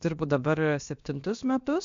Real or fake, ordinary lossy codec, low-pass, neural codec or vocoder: fake; MP3, 64 kbps; 7.2 kHz; codec, 16 kHz, about 1 kbps, DyCAST, with the encoder's durations